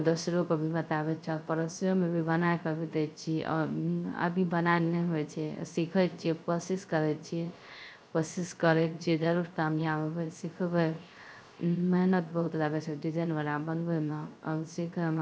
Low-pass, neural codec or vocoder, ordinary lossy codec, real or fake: none; codec, 16 kHz, 0.3 kbps, FocalCodec; none; fake